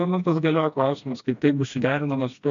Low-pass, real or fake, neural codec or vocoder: 7.2 kHz; fake; codec, 16 kHz, 1 kbps, FreqCodec, smaller model